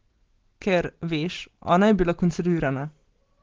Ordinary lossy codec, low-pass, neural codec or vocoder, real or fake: Opus, 16 kbps; 7.2 kHz; none; real